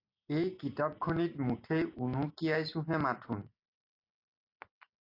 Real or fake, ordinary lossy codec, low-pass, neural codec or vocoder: real; AAC, 32 kbps; 5.4 kHz; none